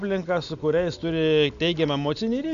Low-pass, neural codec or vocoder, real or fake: 7.2 kHz; none; real